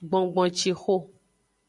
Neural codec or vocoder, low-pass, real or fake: none; 10.8 kHz; real